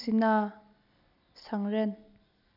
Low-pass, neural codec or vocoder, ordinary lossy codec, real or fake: 5.4 kHz; none; none; real